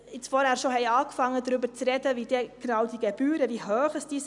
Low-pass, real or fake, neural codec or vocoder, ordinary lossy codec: 10.8 kHz; real; none; none